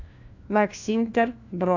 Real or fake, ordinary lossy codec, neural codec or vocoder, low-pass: fake; AAC, 48 kbps; codec, 16 kHz, 1 kbps, FunCodec, trained on LibriTTS, 50 frames a second; 7.2 kHz